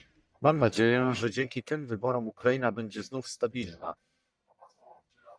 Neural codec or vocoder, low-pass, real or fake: codec, 44.1 kHz, 1.7 kbps, Pupu-Codec; 9.9 kHz; fake